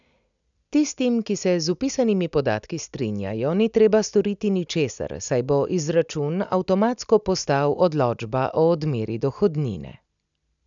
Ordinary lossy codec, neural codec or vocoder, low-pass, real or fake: none; none; 7.2 kHz; real